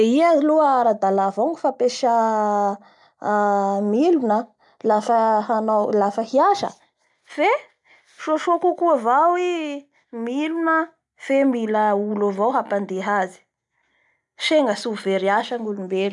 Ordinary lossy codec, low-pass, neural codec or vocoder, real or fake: none; 10.8 kHz; none; real